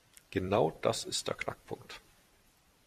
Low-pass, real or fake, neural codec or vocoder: 14.4 kHz; fake; vocoder, 44.1 kHz, 128 mel bands every 256 samples, BigVGAN v2